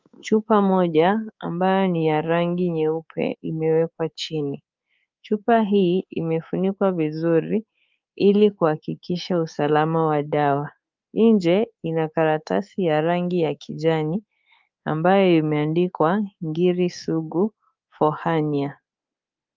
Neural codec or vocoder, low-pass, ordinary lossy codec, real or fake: codec, 24 kHz, 3.1 kbps, DualCodec; 7.2 kHz; Opus, 24 kbps; fake